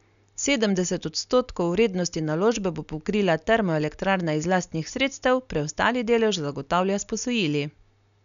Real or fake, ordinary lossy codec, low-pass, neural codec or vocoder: real; none; 7.2 kHz; none